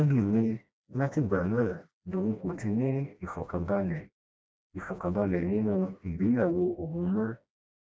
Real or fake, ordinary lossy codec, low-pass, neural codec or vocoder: fake; none; none; codec, 16 kHz, 1 kbps, FreqCodec, smaller model